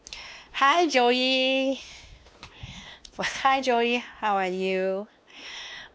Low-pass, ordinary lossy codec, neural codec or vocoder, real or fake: none; none; codec, 16 kHz, 2 kbps, X-Codec, WavLM features, trained on Multilingual LibriSpeech; fake